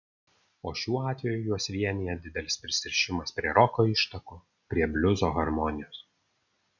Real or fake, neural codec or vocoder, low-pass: real; none; 7.2 kHz